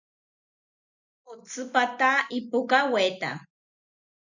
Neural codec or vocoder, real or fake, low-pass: none; real; 7.2 kHz